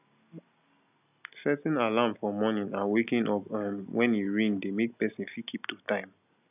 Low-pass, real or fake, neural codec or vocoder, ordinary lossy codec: 3.6 kHz; real; none; none